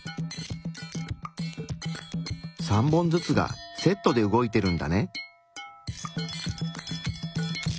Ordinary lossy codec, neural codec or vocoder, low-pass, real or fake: none; none; none; real